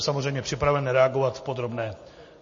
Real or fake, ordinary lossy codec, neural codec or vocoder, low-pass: real; MP3, 32 kbps; none; 7.2 kHz